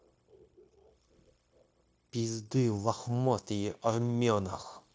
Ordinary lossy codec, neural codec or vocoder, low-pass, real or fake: none; codec, 16 kHz, 0.9 kbps, LongCat-Audio-Codec; none; fake